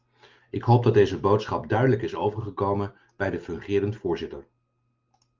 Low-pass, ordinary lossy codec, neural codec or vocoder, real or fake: 7.2 kHz; Opus, 24 kbps; none; real